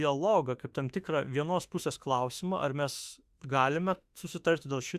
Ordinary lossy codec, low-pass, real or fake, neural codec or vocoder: Opus, 64 kbps; 14.4 kHz; fake; autoencoder, 48 kHz, 32 numbers a frame, DAC-VAE, trained on Japanese speech